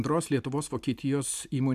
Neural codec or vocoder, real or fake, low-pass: none; real; 14.4 kHz